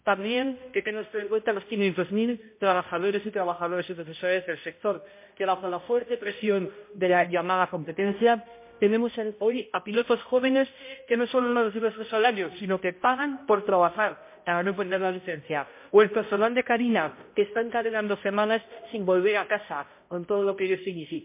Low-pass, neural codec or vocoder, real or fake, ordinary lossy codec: 3.6 kHz; codec, 16 kHz, 0.5 kbps, X-Codec, HuBERT features, trained on balanced general audio; fake; MP3, 24 kbps